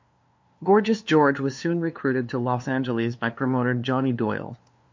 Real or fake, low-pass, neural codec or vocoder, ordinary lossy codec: fake; 7.2 kHz; codec, 16 kHz, 2 kbps, FunCodec, trained on LibriTTS, 25 frames a second; MP3, 48 kbps